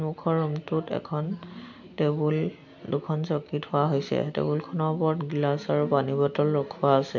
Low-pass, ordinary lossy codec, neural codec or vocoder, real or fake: 7.2 kHz; none; none; real